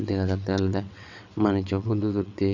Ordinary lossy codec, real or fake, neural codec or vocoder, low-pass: none; fake; vocoder, 22.05 kHz, 80 mel bands, WaveNeXt; 7.2 kHz